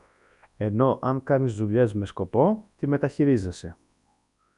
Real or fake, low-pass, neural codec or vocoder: fake; 10.8 kHz; codec, 24 kHz, 0.9 kbps, WavTokenizer, large speech release